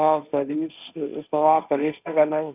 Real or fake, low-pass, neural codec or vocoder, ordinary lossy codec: fake; 3.6 kHz; codec, 16 kHz, 1.1 kbps, Voila-Tokenizer; none